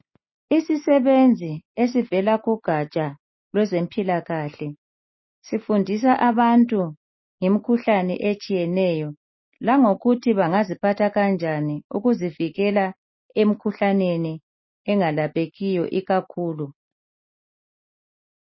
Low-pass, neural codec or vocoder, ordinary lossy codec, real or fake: 7.2 kHz; none; MP3, 24 kbps; real